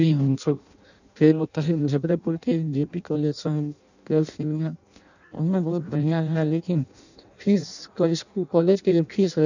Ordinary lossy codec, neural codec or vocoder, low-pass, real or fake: none; codec, 16 kHz in and 24 kHz out, 0.6 kbps, FireRedTTS-2 codec; 7.2 kHz; fake